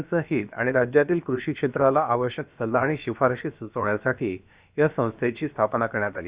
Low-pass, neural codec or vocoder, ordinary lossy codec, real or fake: 3.6 kHz; codec, 16 kHz, about 1 kbps, DyCAST, with the encoder's durations; Opus, 64 kbps; fake